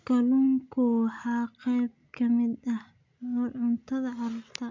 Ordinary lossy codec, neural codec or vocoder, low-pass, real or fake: MP3, 64 kbps; none; 7.2 kHz; real